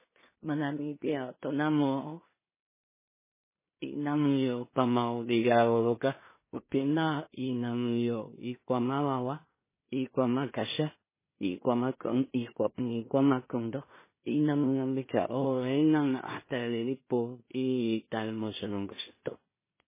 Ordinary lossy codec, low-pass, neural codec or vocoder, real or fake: MP3, 16 kbps; 3.6 kHz; codec, 16 kHz in and 24 kHz out, 0.4 kbps, LongCat-Audio-Codec, two codebook decoder; fake